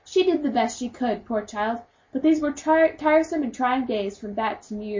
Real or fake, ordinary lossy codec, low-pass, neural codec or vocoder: real; MP3, 32 kbps; 7.2 kHz; none